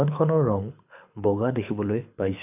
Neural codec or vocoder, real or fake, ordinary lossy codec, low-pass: codec, 24 kHz, 6 kbps, HILCodec; fake; AAC, 32 kbps; 3.6 kHz